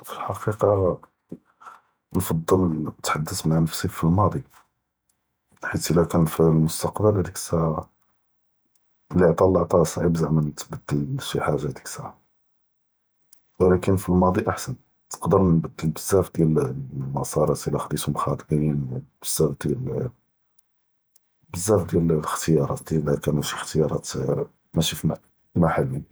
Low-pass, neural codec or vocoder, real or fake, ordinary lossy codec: none; vocoder, 48 kHz, 128 mel bands, Vocos; fake; none